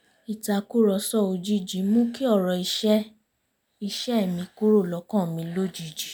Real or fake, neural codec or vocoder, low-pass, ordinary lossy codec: real; none; none; none